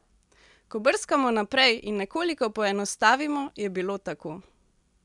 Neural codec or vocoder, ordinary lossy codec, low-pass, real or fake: none; none; 10.8 kHz; real